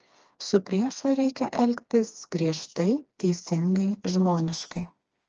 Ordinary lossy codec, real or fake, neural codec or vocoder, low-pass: Opus, 24 kbps; fake; codec, 16 kHz, 2 kbps, FreqCodec, smaller model; 7.2 kHz